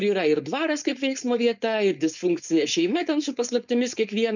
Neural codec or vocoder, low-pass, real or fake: codec, 16 kHz, 4.8 kbps, FACodec; 7.2 kHz; fake